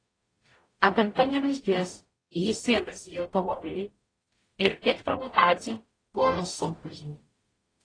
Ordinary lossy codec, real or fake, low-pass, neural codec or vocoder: AAC, 32 kbps; fake; 9.9 kHz; codec, 44.1 kHz, 0.9 kbps, DAC